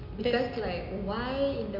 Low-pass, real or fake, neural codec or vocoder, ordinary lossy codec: 5.4 kHz; real; none; none